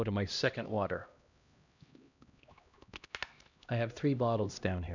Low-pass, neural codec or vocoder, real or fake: 7.2 kHz; codec, 16 kHz, 1 kbps, X-Codec, HuBERT features, trained on LibriSpeech; fake